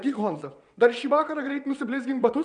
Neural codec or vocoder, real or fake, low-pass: vocoder, 22.05 kHz, 80 mel bands, WaveNeXt; fake; 9.9 kHz